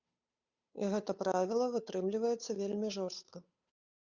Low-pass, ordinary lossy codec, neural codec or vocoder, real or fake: 7.2 kHz; Opus, 64 kbps; codec, 16 kHz, 8 kbps, FunCodec, trained on Chinese and English, 25 frames a second; fake